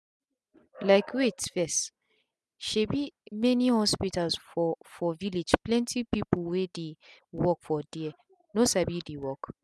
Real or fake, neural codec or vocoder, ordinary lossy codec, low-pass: real; none; none; none